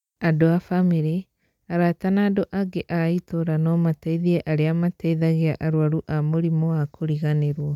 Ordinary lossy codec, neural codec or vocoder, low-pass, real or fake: none; none; 19.8 kHz; real